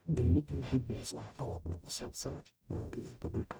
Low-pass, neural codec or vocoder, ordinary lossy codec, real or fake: none; codec, 44.1 kHz, 0.9 kbps, DAC; none; fake